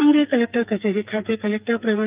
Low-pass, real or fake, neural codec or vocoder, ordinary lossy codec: 3.6 kHz; fake; codec, 44.1 kHz, 2.6 kbps, SNAC; Opus, 24 kbps